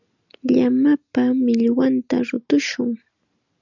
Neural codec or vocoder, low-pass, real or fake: none; 7.2 kHz; real